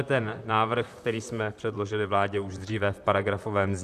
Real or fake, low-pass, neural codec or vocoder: fake; 14.4 kHz; vocoder, 44.1 kHz, 128 mel bands, Pupu-Vocoder